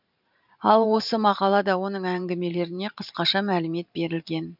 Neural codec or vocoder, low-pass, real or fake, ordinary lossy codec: vocoder, 44.1 kHz, 128 mel bands every 512 samples, BigVGAN v2; 5.4 kHz; fake; none